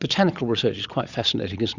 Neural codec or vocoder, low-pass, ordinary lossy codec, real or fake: vocoder, 22.05 kHz, 80 mel bands, Vocos; 7.2 kHz; Opus, 64 kbps; fake